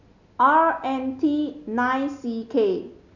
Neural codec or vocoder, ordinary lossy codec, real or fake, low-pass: none; none; real; 7.2 kHz